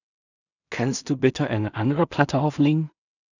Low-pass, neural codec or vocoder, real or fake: 7.2 kHz; codec, 16 kHz in and 24 kHz out, 0.4 kbps, LongCat-Audio-Codec, two codebook decoder; fake